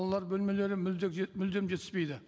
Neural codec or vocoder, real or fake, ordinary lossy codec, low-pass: none; real; none; none